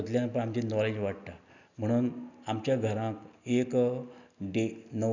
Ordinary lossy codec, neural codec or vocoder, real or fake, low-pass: none; none; real; 7.2 kHz